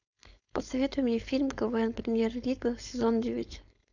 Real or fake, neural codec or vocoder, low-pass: fake; codec, 16 kHz, 4.8 kbps, FACodec; 7.2 kHz